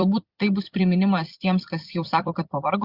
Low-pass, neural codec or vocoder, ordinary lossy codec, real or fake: 5.4 kHz; vocoder, 44.1 kHz, 128 mel bands every 256 samples, BigVGAN v2; Opus, 64 kbps; fake